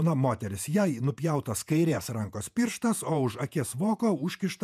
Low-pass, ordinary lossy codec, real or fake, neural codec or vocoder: 14.4 kHz; MP3, 96 kbps; fake; vocoder, 48 kHz, 128 mel bands, Vocos